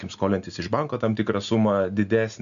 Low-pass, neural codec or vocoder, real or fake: 7.2 kHz; none; real